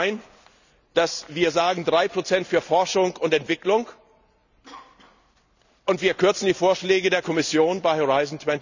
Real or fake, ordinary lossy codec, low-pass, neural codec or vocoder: real; none; 7.2 kHz; none